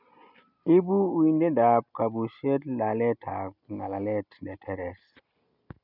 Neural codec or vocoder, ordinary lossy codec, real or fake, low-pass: none; none; real; 5.4 kHz